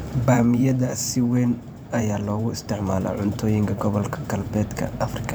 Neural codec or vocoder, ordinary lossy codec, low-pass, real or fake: vocoder, 44.1 kHz, 128 mel bands every 512 samples, BigVGAN v2; none; none; fake